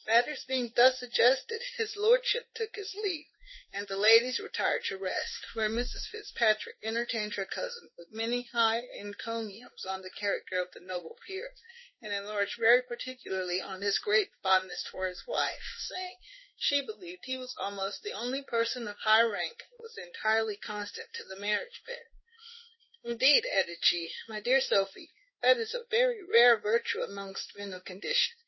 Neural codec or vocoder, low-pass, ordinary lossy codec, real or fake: codec, 16 kHz in and 24 kHz out, 1 kbps, XY-Tokenizer; 7.2 kHz; MP3, 24 kbps; fake